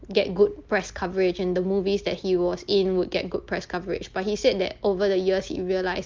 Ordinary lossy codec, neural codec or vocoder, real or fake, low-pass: Opus, 24 kbps; none; real; 7.2 kHz